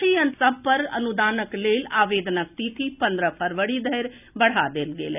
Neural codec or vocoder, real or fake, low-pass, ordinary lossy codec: none; real; 3.6 kHz; none